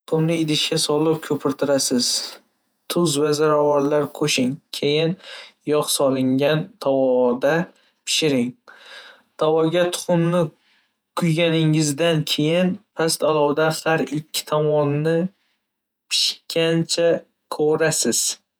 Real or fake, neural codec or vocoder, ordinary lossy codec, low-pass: fake; vocoder, 48 kHz, 128 mel bands, Vocos; none; none